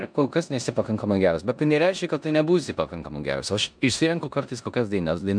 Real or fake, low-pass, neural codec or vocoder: fake; 9.9 kHz; codec, 16 kHz in and 24 kHz out, 0.9 kbps, LongCat-Audio-Codec, four codebook decoder